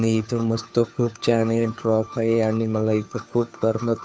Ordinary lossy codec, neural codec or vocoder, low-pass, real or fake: none; codec, 16 kHz, 2 kbps, FunCodec, trained on Chinese and English, 25 frames a second; none; fake